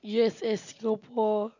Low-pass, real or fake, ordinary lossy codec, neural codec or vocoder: 7.2 kHz; real; none; none